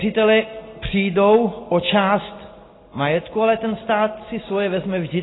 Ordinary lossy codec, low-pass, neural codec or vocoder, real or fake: AAC, 16 kbps; 7.2 kHz; none; real